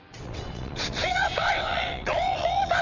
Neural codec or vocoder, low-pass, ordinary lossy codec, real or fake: vocoder, 22.05 kHz, 80 mel bands, WaveNeXt; 7.2 kHz; MP3, 48 kbps; fake